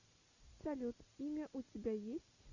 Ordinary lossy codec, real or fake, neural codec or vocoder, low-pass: Opus, 64 kbps; real; none; 7.2 kHz